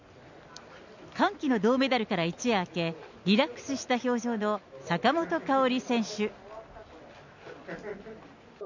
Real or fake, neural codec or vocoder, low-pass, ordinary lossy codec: real; none; 7.2 kHz; none